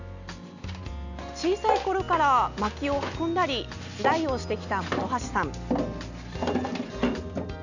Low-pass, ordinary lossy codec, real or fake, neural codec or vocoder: 7.2 kHz; none; real; none